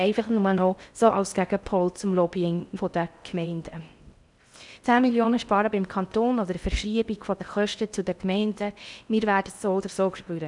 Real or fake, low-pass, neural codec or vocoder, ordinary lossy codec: fake; 10.8 kHz; codec, 16 kHz in and 24 kHz out, 0.6 kbps, FocalCodec, streaming, 4096 codes; none